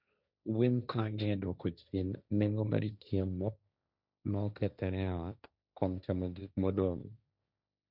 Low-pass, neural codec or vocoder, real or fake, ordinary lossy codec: 5.4 kHz; codec, 16 kHz, 1.1 kbps, Voila-Tokenizer; fake; none